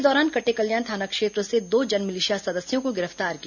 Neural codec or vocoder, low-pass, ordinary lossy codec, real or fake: none; 7.2 kHz; none; real